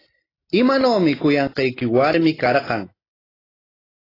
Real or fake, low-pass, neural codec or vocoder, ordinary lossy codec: real; 5.4 kHz; none; AAC, 24 kbps